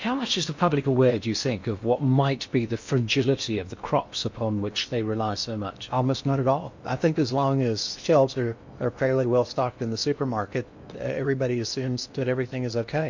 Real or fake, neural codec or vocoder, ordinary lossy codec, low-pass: fake; codec, 16 kHz in and 24 kHz out, 0.8 kbps, FocalCodec, streaming, 65536 codes; MP3, 48 kbps; 7.2 kHz